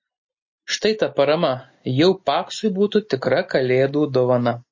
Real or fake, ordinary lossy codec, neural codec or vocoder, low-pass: real; MP3, 32 kbps; none; 7.2 kHz